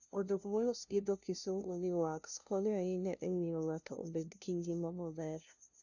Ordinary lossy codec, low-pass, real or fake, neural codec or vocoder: none; 7.2 kHz; fake; codec, 16 kHz, 0.5 kbps, FunCodec, trained on LibriTTS, 25 frames a second